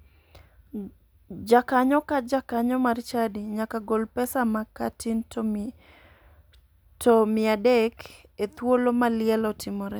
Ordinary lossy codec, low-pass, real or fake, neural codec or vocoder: none; none; real; none